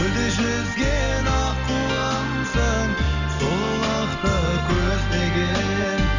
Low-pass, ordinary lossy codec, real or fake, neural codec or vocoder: 7.2 kHz; none; real; none